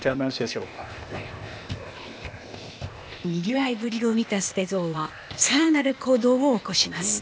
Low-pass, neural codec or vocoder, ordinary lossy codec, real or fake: none; codec, 16 kHz, 0.8 kbps, ZipCodec; none; fake